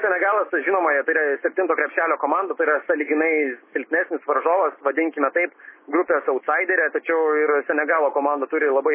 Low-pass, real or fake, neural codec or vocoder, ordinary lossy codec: 3.6 kHz; real; none; MP3, 16 kbps